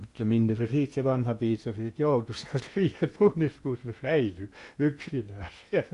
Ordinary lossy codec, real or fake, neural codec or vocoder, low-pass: Opus, 64 kbps; fake; codec, 16 kHz in and 24 kHz out, 0.8 kbps, FocalCodec, streaming, 65536 codes; 10.8 kHz